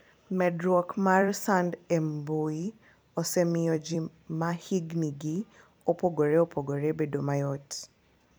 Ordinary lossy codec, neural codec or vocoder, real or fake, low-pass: none; vocoder, 44.1 kHz, 128 mel bands every 256 samples, BigVGAN v2; fake; none